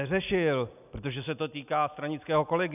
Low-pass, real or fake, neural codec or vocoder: 3.6 kHz; real; none